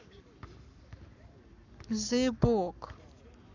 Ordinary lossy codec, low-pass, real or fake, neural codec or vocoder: none; 7.2 kHz; fake; codec, 44.1 kHz, 7.8 kbps, Pupu-Codec